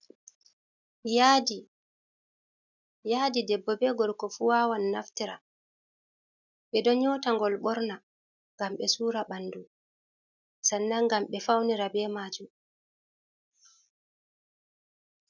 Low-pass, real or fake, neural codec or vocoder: 7.2 kHz; real; none